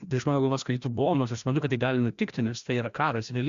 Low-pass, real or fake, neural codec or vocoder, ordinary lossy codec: 7.2 kHz; fake; codec, 16 kHz, 1 kbps, FreqCodec, larger model; Opus, 64 kbps